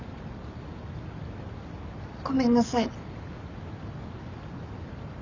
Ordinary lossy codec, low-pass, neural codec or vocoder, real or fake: none; 7.2 kHz; none; real